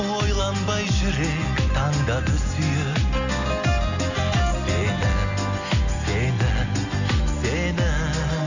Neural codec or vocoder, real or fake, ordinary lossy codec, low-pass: none; real; none; 7.2 kHz